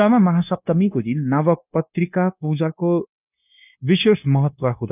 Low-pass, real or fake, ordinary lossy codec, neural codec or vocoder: 3.6 kHz; fake; none; codec, 16 kHz, 0.9 kbps, LongCat-Audio-Codec